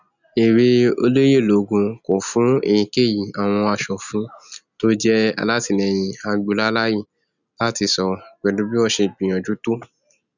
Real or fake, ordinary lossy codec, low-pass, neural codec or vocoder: real; none; 7.2 kHz; none